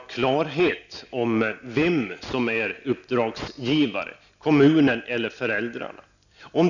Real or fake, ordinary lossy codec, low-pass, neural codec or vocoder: real; none; 7.2 kHz; none